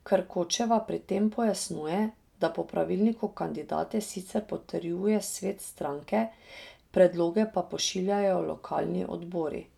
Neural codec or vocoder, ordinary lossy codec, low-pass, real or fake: none; none; 19.8 kHz; real